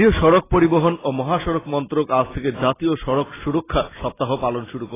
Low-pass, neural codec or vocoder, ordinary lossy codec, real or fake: 3.6 kHz; none; AAC, 16 kbps; real